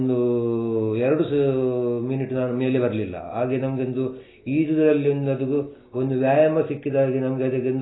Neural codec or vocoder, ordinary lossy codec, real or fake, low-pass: none; AAC, 16 kbps; real; 7.2 kHz